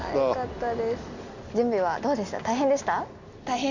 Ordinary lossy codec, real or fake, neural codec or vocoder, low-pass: none; real; none; 7.2 kHz